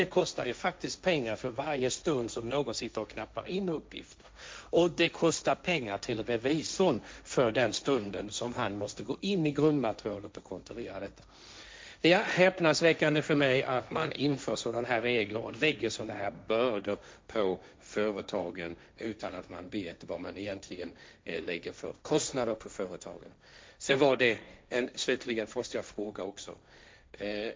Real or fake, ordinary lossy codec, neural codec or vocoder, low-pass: fake; none; codec, 16 kHz, 1.1 kbps, Voila-Tokenizer; none